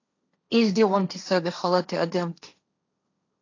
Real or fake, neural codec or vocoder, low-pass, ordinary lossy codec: fake; codec, 16 kHz, 1.1 kbps, Voila-Tokenizer; 7.2 kHz; AAC, 48 kbps